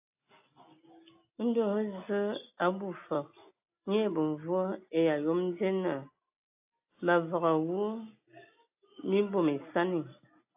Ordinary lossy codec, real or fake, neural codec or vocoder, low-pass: AAC, 32 kbps; real; none; 3.6 kHz